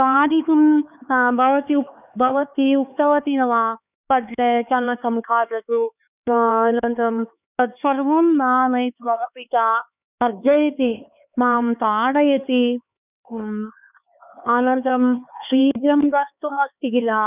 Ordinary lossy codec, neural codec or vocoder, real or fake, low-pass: none; codec, 16 kHz, 4 kbps, X-Codec, HuBERT features, trained on LibriSpeech; fake; 3.6 kHz